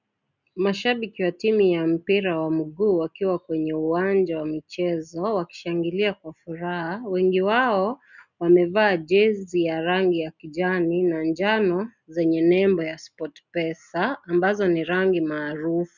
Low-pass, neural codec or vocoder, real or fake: 7.2 kHz; none; real